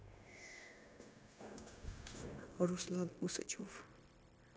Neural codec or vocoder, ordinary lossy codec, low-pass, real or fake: codec, 16 kHz, 0.9 kbps, LongCat-Audio-Codec; none; none; fake